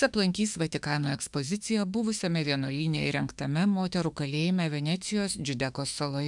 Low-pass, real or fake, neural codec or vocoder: 10.8 kHz; fake; autoencoder, 48 kHz, 32 numbers a frame, DAC-VAE, trained on Japanese speech